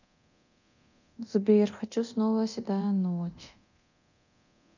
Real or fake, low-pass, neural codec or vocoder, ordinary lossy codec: fake; 7.2 kHz; codec, 24 kHz, 0.9 kbps, DualCodec; none